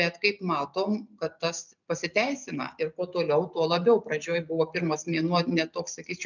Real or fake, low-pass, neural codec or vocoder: real; 7.2 kHz; none